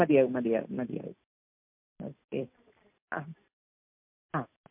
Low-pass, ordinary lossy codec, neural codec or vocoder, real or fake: 3.6 kHz; MP3, 32 kbps; none; real